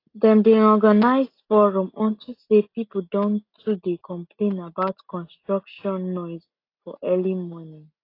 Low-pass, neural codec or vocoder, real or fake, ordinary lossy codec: 5.4 kHz; none; real; AAC, 32 kbps